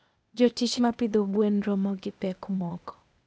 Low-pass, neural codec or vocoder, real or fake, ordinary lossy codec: none; codec, 16 kHz, 0.8 kbps, ZipCodec; fake; none